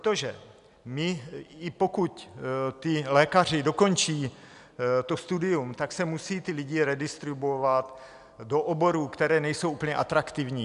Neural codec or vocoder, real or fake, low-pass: none; real; 10.8 kHz